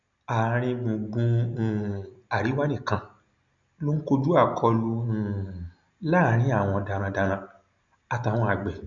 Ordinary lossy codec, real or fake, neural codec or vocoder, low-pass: none; real; none; 7.2 kHz